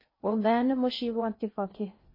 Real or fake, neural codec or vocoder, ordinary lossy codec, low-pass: fake; codec, 16 kHz in and 24 kHz out, 0.6 kbps, FocalCodec, streaming, 2048 codes; MP3, 24 kbps; 5.4 kHz